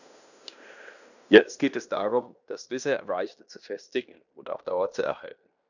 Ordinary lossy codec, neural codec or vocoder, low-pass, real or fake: none; codec, 16 kHz in and 24 kHz out, 0.9 kbps, LongCat-Audio-Codec, fine tuned four codebook decoder; 7.2 kHz; fake